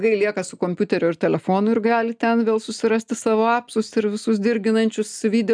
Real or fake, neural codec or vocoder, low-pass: real; none; 9.9 kHz